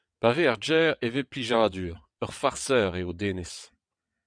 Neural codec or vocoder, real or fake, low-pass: vocoder, 22.05 kHz, 80 mel bands, WaveNeXt; fake; 9.9 kHz